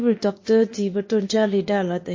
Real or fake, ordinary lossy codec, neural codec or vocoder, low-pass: fake; MP3, 32 kbps; codec, 16 kHz, 0.8 kbps, ZipCodec; 7.2 kHz